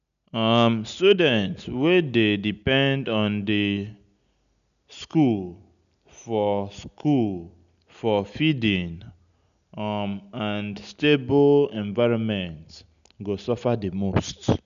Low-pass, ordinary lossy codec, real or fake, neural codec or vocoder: 7.2 kHz; none; real; none